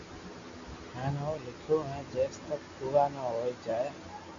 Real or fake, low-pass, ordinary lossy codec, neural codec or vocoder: real; 7.2 kHz; MP3, 64 kbps; none